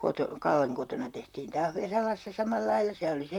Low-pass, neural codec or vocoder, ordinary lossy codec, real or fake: 19.8 kHz; vocoder, 44.1 kHz, 128 mel bands, Pupu-Vocoder; none; fake